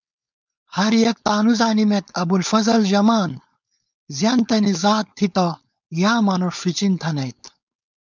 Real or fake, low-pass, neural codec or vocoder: fake; 7.2 kHz; codec, 16 kHz, 4.8 kbps, FACodec